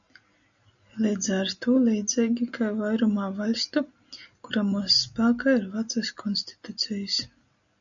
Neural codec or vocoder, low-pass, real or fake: none; 7.2 kHz; real